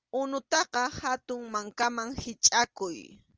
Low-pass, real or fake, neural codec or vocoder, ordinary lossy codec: 7.2 kHz; real; none; Opus, 24 kbps